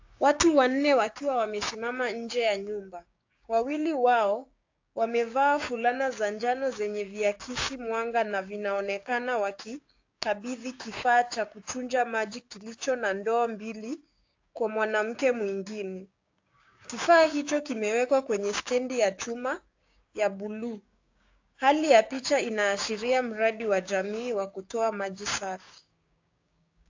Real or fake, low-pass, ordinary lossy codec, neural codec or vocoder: fake; 7.2 kHz; AAC, 48 kbps; codec, 16 kHz, 6 kbps, DAC